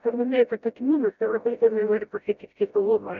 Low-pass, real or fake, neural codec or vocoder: 7.2 kHz; fake; codec, 16 kHz, 0.5 kbps, FreqCodec, smaller model